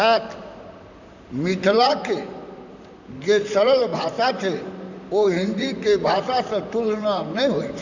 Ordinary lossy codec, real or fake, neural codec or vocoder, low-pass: none; fake; vocoder, 44.1 kHz, 128 mel bands, Pupu-Vocoder; 7.2 kHz